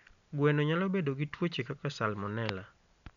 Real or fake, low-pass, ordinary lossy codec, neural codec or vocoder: real; 7.2 kHz; none; none